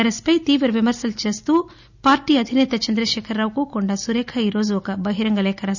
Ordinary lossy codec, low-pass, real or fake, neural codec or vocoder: none; 7.2 kHz; real; none